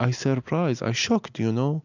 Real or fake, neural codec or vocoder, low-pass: real; none; 7.2 kHz